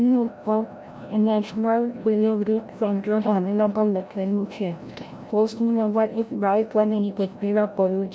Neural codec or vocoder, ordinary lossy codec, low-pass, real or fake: codec, 16 kHz, 0.5 kbps, FreqCodec, larger model; none; none; fake